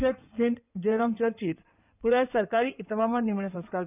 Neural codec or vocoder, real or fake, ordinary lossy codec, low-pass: codec, 16 kHz in and 24 kHz out, 2.2 kbps, FireRedTTS-2 codec; fake; none; 3.6 kHz